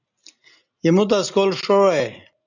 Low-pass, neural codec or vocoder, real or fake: 7.2 kHz; none; real